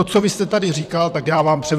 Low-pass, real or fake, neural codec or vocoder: 14.4 kHz; fake; vocoder, 44.1 kHz, 128 mel bands, Pupu-Vocoder